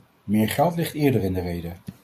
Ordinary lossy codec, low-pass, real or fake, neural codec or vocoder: AAC, 64 kbps; 14.4 kHz; real; none